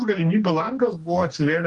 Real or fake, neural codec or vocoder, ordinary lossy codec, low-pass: fake; codec, 44.1 kHz, 2.6 kbps, DAC; Opus, 24 kbps; 10.8 kHz